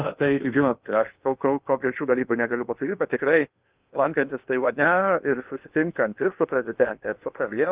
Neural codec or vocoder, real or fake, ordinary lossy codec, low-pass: codec, 16 kHz in and 24 kHz out, 0.8 kbps, FocalCodec, streaming, 65536 codes; fake; Opus, 32 kbps; 3.6 kHz